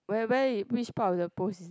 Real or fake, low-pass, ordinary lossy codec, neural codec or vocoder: real; none; none; none